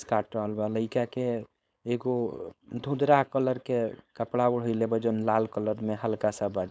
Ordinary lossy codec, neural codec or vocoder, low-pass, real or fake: none; codec, 16 kHz, 4.8 kbps, FACodec; none; fake